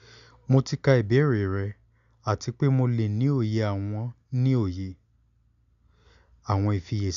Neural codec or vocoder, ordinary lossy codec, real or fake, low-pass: none; none; real; 7.2 kHz